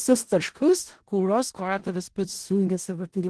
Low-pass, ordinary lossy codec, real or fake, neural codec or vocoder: 10.8 kHz; Opus, 16 kbps; fake; codec, 16 kHz in and 24 kHz out, 0.4 kbps, LongCat-Audio-Codec, four codebook decoder